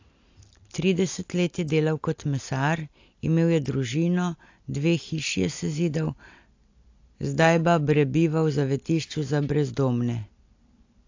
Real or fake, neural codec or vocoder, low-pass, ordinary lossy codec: real; none; 7.2 kHz; AAC, 48 kbps